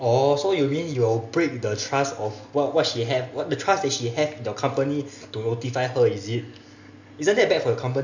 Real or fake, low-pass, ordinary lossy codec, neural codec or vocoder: real; 7.2 kHz; none; none